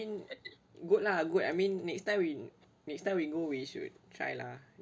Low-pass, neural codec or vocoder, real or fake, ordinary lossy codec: none; none; real; none